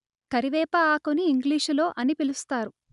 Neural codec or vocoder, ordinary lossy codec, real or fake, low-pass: none; none; real; 10.8 kHz